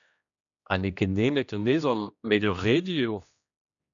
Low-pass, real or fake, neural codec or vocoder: 7.2 kHz; fake; codec, 16 kHz, 1 kbps, X-Codec, HuBERT features, trained on general audio